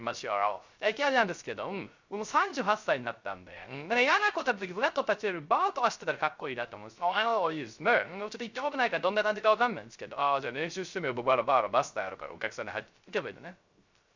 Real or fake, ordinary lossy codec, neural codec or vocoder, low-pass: fake; Opus, 64 kbps; codec, 16 kHz, 0.3 kbps, FocalCodec; 7.2 kHz